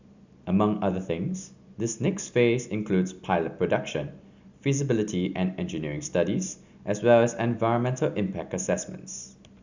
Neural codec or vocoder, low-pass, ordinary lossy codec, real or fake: none; 7.2 kHz; Opus, 64 kbps; real